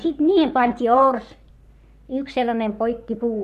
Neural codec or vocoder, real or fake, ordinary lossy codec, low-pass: codec, 44.1 kHz, 3.4 kbps, Pupu-Codec; fake; none; 14.4 kHz